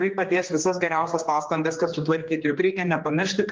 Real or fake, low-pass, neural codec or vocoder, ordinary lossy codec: fake; 7.2 kHz; codec, 16 kHz, 2 kbps, X-Codec, HuBERT features, trained on general audio; Opus, 32 kbps